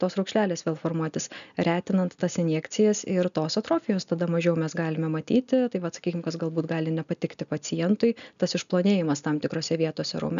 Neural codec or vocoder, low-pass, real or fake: none; 7.2 kHz; real